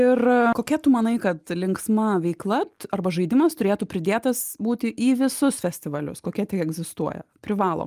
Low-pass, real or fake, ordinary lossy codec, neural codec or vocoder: 14.4 kHz; real; Opus, 32 kbps; none